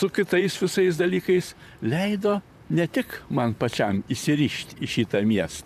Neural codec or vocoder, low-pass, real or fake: vocoder, 44.1 kHz, 128 mel bands, Pupu-Vocoder; 14.4 kHz; fake